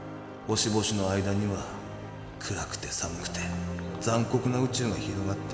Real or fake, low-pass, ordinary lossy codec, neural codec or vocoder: real; none; none; none